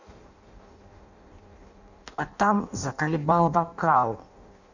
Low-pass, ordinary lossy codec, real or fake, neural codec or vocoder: 7.2 kHz; none; fake; codec, 16 kHz in and 24 kHz out, 0.6 kbps, FireRedTTS-2 codec